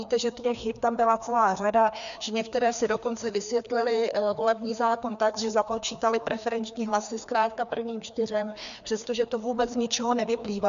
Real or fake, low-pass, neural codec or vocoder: fake; 7.2 kHz; codec, 16 kHz, 2 kbps, FreqCodec, larger model